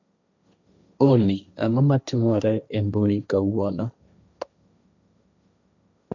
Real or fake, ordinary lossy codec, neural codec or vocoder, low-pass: fake; none; codec, 16 kHz, 1.1 kbps, Voila-Tokenizer; 7.2 kHz